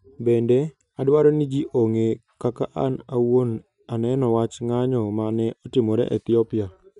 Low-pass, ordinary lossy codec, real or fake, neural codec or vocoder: 10.8 kHz; none; real; none